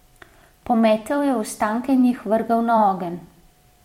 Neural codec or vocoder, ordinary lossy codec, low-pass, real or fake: vocoder, 44.1 kHz, 128 mel bands every 256 samples, BigVGAN v2; MP3, 64 kbps; 19.8 kHz; fake